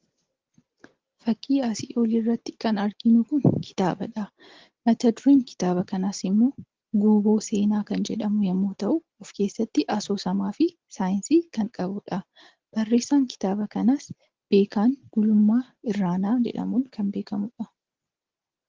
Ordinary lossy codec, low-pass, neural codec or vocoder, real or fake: Opus, 16 kbps; 7.2 kHz; none; real